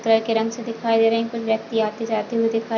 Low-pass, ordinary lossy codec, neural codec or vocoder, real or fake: 7.2 kHz; none; none; real